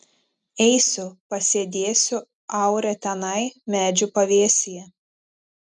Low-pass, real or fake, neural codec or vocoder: 10.8 kHz; real; none